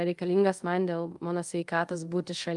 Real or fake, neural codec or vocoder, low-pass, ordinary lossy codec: fake; codec, 24 kHz, 0.5 kbps, DualCodec; 10.8 kHz; Opus, 32 kbps